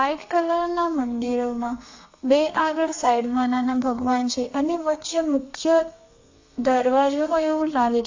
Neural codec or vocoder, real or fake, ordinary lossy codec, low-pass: codec, 32 kHz, 1.9 kbps, SNAC; fake; AAC, 48 kbps; 7.2 kHz